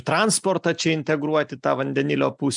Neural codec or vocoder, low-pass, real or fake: none; 10.8 kHz; real